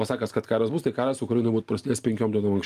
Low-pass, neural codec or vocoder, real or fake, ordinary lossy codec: 14.4 kHz; none; real; Opus, 32 kbps